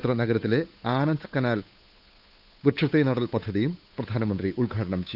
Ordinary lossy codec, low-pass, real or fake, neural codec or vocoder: none; 5.4 kHz; fake; codec, 16 kHz, 8 kbps, FunCodec, trained on Chinese and English, 25 frames a second